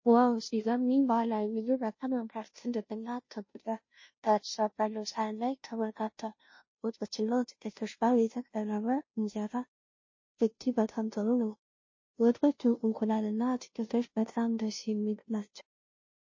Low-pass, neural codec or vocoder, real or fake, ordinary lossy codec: 7.2 kHz; codec, 16 kHz, 0.5 kbps, FunCodec, trained on Chinese and English, 25 frames a second; fake; MP3, 32 kbps